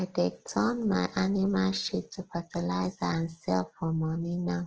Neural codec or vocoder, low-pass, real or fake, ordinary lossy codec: none; 7.2 kHz; real; Opus, 16 kbps